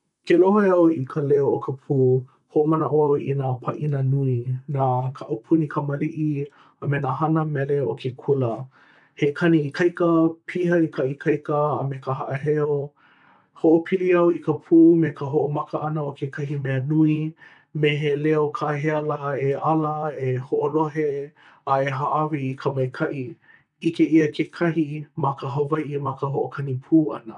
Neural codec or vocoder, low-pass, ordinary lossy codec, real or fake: vocoder, 44.1 kHz, 128 mel bands, Pupu-Vocoder; 10.8 kHz; none; fake